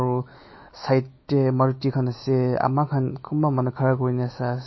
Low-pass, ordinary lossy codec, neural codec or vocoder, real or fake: 7.2 kHz; MP3, 24 kbps; autoencoder, 48 kHz, 128 numbers a frame, DAC-VAE, trained on Japanese speech; fake